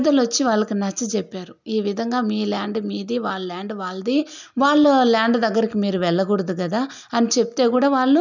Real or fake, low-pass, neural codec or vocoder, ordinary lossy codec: real; 7.2 kHz; none; none